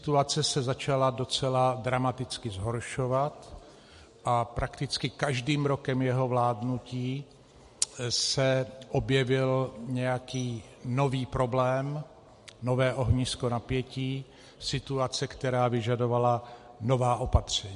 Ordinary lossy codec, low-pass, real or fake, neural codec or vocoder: MP3, 48 kbps; 14.4 kHz; real; none